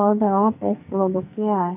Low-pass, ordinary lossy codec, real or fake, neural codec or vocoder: 3.6 kHz; none; fake; codec, 44.1 kHz, 2.6 kbps, SNAC